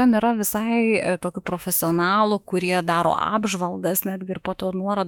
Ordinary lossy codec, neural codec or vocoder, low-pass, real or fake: MP3, 96 kbps; autoencoder, 48 kHz, 32 numbers a frame, DAC-VAE, trained on Japanese speech; 19.8 kHz; fake